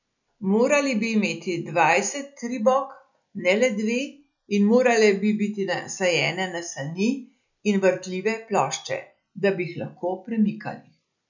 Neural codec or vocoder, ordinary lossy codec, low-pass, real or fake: none; none; 7.2 kHz; real